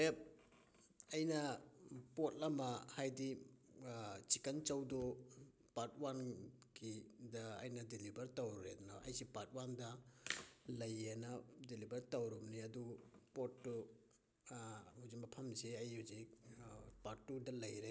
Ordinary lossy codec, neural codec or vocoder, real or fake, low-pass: none; none; real; none